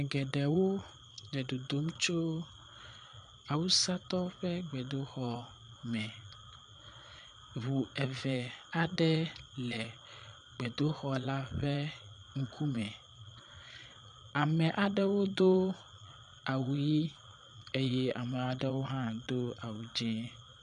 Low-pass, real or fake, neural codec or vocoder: 9.9 kHz; fake; vocoder, 22.05 kHz, 80 mel bands, Vocos